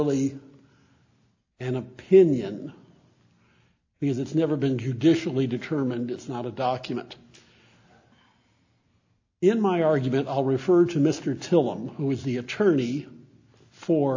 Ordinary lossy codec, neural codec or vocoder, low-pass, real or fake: AAC, 48 kbps; none; 7.2 kHz; real